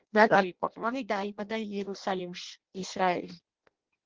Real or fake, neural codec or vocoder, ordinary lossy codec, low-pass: fake; codec, 16 kHz in and 24 kHz out, 0.6 kbps, FireRedTTS-2 codec; Opus, 16 kbps; 7.2 kHz